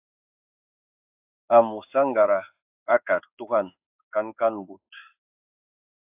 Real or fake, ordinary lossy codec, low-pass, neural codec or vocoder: fake; AAC, 32 kbps; 3.6 kHz; codec, 16 kHz in and 24 kHz out, 1 kbps, XY-Tokenizer